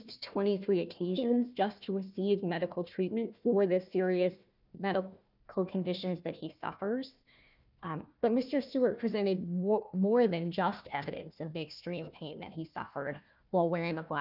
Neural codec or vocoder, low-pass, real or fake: codec, 16 kHz, 1 kbps, FunCodec, trained on Chinese and English, 50 frames a second; 5.4 kHz; fake